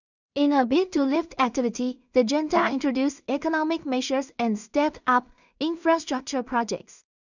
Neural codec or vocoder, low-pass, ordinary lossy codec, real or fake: codec, 16 kHz in and 24 kHz out, 0.4 kbps, LongCat-Audio-Codec, two codebook decoder; 7.2 kHz; none; fake